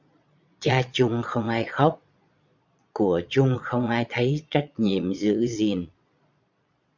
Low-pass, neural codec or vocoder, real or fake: 7.2 kHz; vocoder, 22.05 kHz, 80 mel bands, Vocos; fake